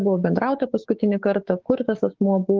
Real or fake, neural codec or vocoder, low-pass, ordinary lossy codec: fake; codec, 16 kHz, 6 kbps, DAC; 7.2 kHz; Opus, 16 kbps